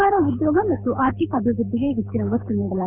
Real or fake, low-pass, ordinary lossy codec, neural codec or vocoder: fake; 3.6 kHz; none; codec, 24 kHz, 6 kbps, HILCodec